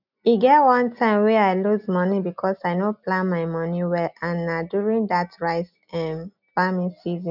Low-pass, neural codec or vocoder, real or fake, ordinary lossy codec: 5.4 kHz; none; real; none